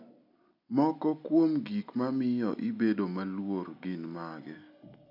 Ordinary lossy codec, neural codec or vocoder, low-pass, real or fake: none; none; 5.4 kHz; real